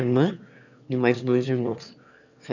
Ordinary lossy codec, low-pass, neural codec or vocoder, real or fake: none; 7.2 kHz; autoencoder, 22.05 kHz, a latent of 192 numbers a frame, VITS, trained on one speaker; fake